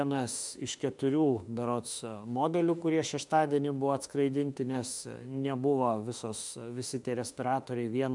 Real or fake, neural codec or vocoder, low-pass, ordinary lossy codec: fake; autoencoder, 48 kHz, 32 numbers a frame, DAC-VAE, trained on Japanese speech; 10.8 kHz; MP3, 96 kbps